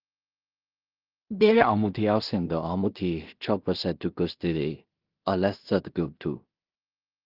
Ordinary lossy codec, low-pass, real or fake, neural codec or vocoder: Opus, 32 kbps; 5.4 kHz; fake; codec, 16 kHz in and 24 kHz out, 0.4 kbps, LongCat-Audio-Codec, two codebook decoder